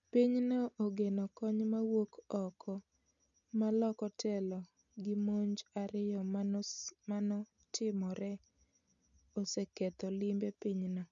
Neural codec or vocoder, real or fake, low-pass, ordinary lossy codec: none; real; 7.2 kHz; none